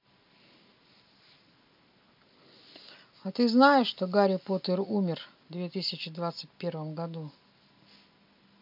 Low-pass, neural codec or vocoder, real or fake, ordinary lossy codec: 5.4 kHz; none; real; MP3, 48 kbps